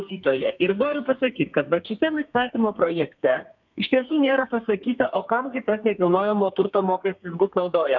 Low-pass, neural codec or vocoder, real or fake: 7.2 kHz; codec, 32 kHz, 1.9 kbps, SNAC; fake